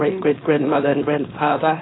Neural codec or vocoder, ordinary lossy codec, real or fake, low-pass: codec, 16 kHz, 4.8 kbps, FACodec; AAC, 16 kbps; fake; 7.2 kHz